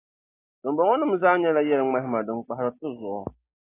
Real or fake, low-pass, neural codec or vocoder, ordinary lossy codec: real; 3.6 kHz; none; AAC, 16 kbps